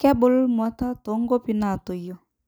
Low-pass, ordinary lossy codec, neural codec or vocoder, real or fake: none; none; none; real